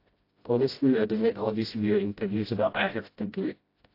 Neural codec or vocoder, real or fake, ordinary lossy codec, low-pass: codec, 16 kHz, 0.5 kbps, FreqCodec, smaller model; fake; AAC, 32 kbps; 5.4 kHz